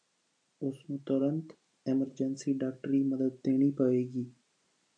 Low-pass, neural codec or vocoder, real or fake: 9.9 kHz; none; real